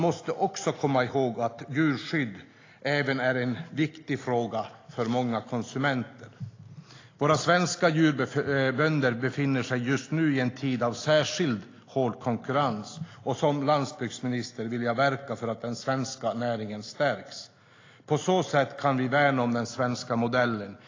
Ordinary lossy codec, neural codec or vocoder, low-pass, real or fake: AAC, 32 kbps; none; 7.2 kHz; real